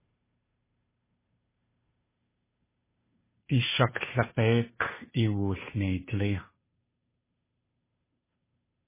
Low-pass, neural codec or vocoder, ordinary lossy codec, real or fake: 3.6 kHz; codec, 16 kHz, 2 kbps, FunCodec, trained on Chinese and English, 25 frames a second; MP3, 16 kbps; fake